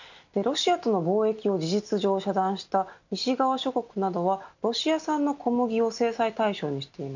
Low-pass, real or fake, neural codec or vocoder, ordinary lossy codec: 7.2 kHz; real; none; none